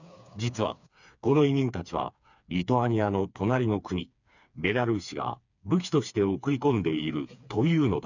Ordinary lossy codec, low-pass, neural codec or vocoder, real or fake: none; 7.2 kHz; codec, 16 kHz, 4 kbps, FreqCodec, smaller model; fake